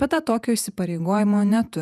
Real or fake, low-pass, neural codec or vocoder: fake; 14.4 kHz; vocoder, 48 kHz, 128 mel bands, Vocos